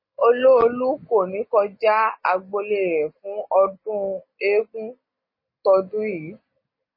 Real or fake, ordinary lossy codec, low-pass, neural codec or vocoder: real; MP3, 24 kbps; 5.4 kHz; none